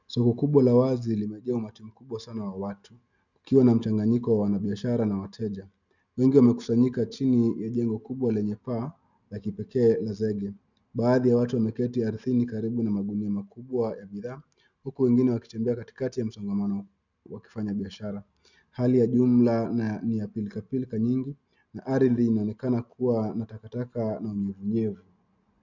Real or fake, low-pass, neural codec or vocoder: real; 7.2 kHz; none